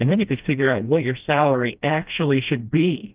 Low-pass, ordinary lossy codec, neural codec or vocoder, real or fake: 3.6 kHz; Opus, 24 kbps; codec, 16 kHz, 1 kbps, FreqCodec, smaller model; fake